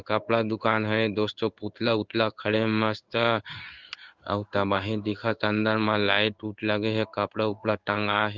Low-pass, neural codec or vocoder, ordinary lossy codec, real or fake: 7.2 kHz; codec, 16 kHz in and 24 kHz out, 1 kbps, XY-Tokenizer; Opus, 32 kbps; fake